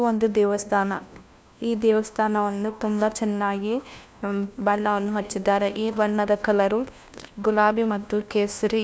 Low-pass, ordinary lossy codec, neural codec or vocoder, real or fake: none; none; codec, 16 kHz, 1 kbps, FunCodec, trained on LibriTTS, 50 frames a second; fake